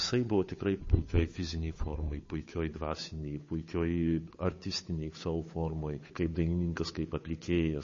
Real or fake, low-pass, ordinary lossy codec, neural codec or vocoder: fake; 7.2 kHz; MP3, 32 kbps; codec, 16 kHz, 8 kbps, FunCodec, trained on Chinese and English, 25 frames a second